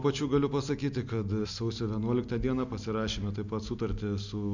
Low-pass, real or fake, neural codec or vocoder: 7.2 kHz; real; none